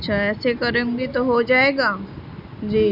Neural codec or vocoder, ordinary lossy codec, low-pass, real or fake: none; none; 5.4 kHz; real